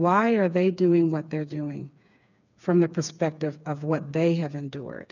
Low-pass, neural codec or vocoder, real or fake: 7.2 kHz; codec, 16 kHz, 4 kbps, FreqCodec, smaller model; fake